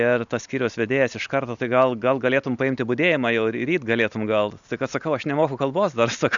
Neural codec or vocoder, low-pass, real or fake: none; 7.2 kHz; real